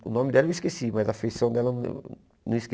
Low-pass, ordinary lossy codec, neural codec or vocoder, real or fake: none; none; none; real